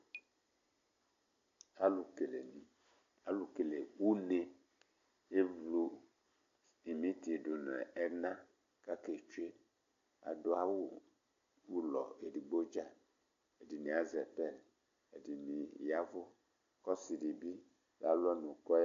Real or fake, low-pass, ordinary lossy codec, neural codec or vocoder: real; 7.2 kHz; AAC, 48 kbps; none